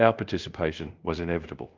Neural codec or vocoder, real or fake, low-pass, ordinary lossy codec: codec, 24 kHz, 0.5 kbps, DualCodec; fake; 7.2 kHz; Opus, 32 kbps